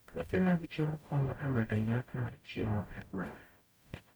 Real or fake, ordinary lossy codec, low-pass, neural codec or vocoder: fake; none; none; codec, 44.1 kHz, 0.9 kbps, DAC